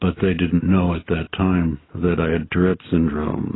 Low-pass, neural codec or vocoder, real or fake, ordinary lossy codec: 7.2 kHz; none; real; AAC, 16 kbps